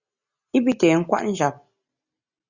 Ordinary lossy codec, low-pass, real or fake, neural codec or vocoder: Opus, 64 kbps; 7.2 kHz; real; none